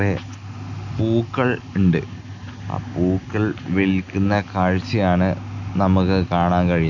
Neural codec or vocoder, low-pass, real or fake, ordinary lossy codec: none; 7.2 kHz; real; none